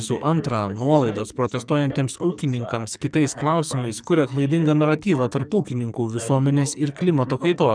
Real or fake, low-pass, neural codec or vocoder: fake; 9.9 kHz; codec, 44.1 kHz, 2.6 kbps, SNAC